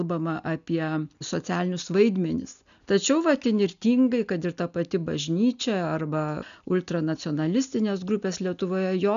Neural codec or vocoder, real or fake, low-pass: none; real; 7.2 kHz